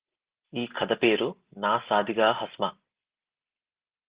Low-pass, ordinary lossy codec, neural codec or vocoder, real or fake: 3.6 kHz; Opus, 16 kbps; none; real